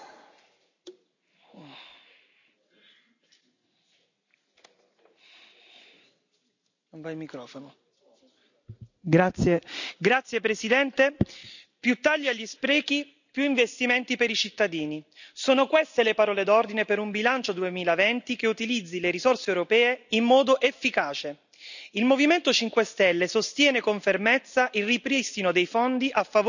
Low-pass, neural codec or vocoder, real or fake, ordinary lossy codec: 7.2 kHz; none; real; none